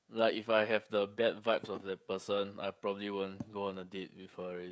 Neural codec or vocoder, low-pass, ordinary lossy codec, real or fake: codec, 16 kHz, 16 kbps, FreqCodec, smaller model; none; none; fake